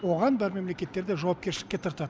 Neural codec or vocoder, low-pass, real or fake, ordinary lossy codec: none; none; real; none